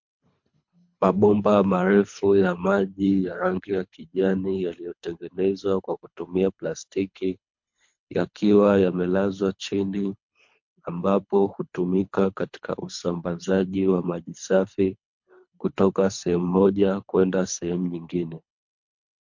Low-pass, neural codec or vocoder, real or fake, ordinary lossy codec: 7.2 kHz; codec, 24 kHz, 3 kbps, HILCodec; fake; MP3, 48 kbps